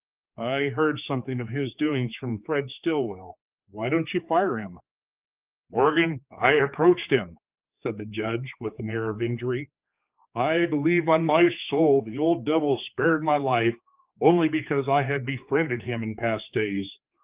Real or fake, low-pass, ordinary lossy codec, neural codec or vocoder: fake; 3.6 kHz; Opus, 24 kbps; codec, 16 kHz, 4 kbps, X-Codec, HuBERT features, trained on general audio